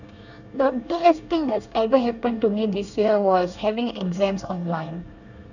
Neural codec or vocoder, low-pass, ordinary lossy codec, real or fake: codec, 24 kHz, 1 kbps, SNAC; 7.2 kHz; none; fake